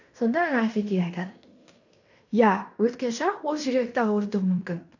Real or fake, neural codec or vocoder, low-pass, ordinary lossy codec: fake; codec, 16 kHz in and 24 kHz out, 0.9 kbps, LongCat-Audio-Codec, fine tuned four codebook decoder; 7.2 kHz; none